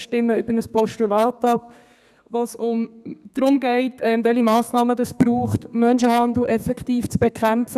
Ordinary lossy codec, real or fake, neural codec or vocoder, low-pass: none; fake; codec, 32 kHz, 1.9 kbps, SNAC; 14.4 kHz